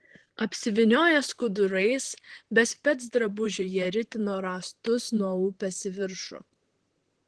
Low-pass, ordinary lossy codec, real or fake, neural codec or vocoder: 10.8 kHz; Opus, 16 kbps; fake; vocoder, 44.1 kHz, 128 mel bands, Pupu-Vocoder